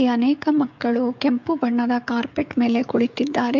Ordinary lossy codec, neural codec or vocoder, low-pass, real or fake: MP3, 64 kbps; codec, 16 kHz, 16 kbps, FreqCodec, smaller model; 7.2 kHz; fake